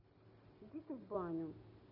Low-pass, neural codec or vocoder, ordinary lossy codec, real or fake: 5.4 kHz; none; none; real